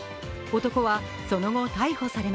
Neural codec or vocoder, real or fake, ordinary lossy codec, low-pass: none; real; none; none